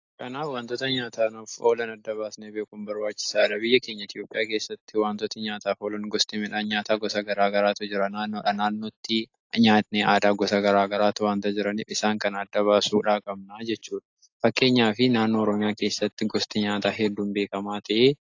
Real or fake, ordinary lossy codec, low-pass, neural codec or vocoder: real; AAC, 48 kbps; 7.2 kHz; none